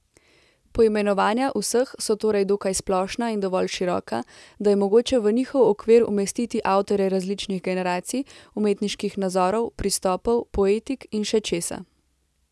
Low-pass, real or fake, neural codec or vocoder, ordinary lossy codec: none; real; none; none